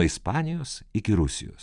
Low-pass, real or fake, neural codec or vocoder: 10.8 kHz; real; none